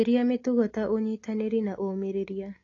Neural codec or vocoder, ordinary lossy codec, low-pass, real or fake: none; AAC, 32 kbps; 7.2 kHz; real